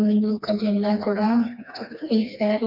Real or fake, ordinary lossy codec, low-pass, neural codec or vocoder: fake; none; 5.4 kHz; codec, 16 kHz, 2 kbps, FreqCodec, smaller model